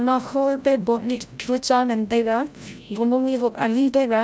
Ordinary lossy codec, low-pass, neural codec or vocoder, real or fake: none; none; codec, 16 kHz, 0.5 kbps, FreqCodec, larger model; fake